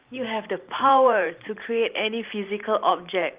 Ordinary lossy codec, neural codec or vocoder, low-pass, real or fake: Opus, 32 kbps; vocoder, 44.1 kHz, 128 mel bands every 512 samples, BigVGAN v2; 3.6 kHz; fake